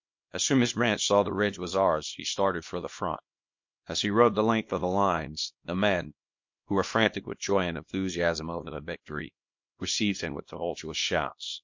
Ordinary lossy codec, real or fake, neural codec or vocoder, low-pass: MP3, 48 kbps; fake; codec, 24 kHz, 0.9 kbps, WavTokenizer, small release; 7.2 kHz